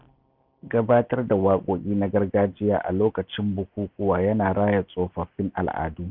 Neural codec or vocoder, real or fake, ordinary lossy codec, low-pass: none; real; none; 5.4 kHz